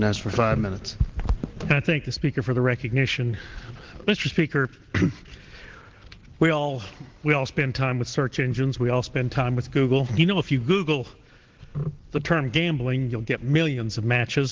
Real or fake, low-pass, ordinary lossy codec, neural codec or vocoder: real; 7.2 kHz; Opus, 16 kbps; none